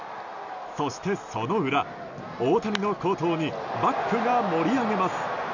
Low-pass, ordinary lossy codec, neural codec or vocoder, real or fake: 7.2 kHz; none; none; real